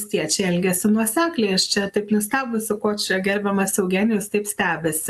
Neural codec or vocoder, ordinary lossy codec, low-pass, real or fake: none; AAC, 96 kbps; 14.4 kHz; real